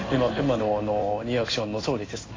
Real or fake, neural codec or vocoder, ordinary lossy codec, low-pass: fake; codec, 16 kHz in and 24 kHz out, 1 kbps, XY-Tokenizer; AAC, 32 kbps; 7.2 kHz